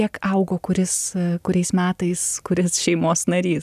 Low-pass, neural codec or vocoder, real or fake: 14.4 kHz; none; real